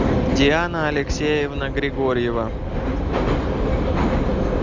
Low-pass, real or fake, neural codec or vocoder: 7.2 kHz; real; none